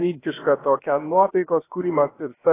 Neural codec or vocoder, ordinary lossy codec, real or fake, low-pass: codec, 16 kHz, about 1 kbps, DyCAST, with the encoder's durations; AAC, 16 kbps; fake; 3.6 kHz